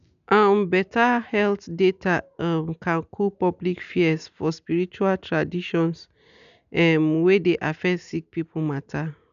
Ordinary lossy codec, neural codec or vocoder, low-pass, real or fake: none; none; 7.2 kHz; real